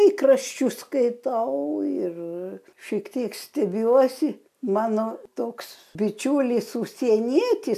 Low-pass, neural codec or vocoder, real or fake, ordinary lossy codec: 14.4 kHz; none; real; AAC, 96 kbps